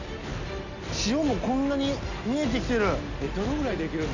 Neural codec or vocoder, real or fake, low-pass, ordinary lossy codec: none; real; 7.2 kHz; none